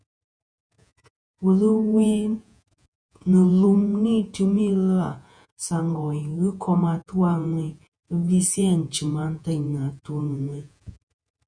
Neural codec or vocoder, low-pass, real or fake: vocoder, 48 kHz, 128 mel bands, Vocos; 9.9 kHz; fake